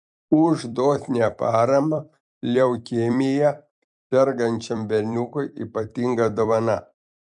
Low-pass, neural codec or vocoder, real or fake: 10.8 kHz; vocoder, 44.1 kHz, 128 mel bands every 256 samples, BigVGAN v2; fake